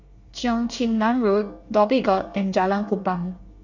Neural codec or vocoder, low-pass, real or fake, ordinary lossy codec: codec, 24 kHz, 1 kbps, SNAC; 7.2 kHz; fake; none